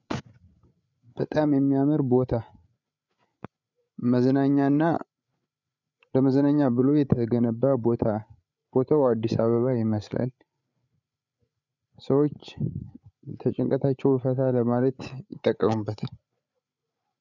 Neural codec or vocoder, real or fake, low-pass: codec, 16 kHz, 8 kbps, FreqCodec, larger model; fake; 7.2 kHz